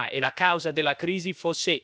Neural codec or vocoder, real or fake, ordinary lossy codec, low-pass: codec, 16 kHz, about 1 kbps, DyCAST, with the encoder's durations; fake; none; none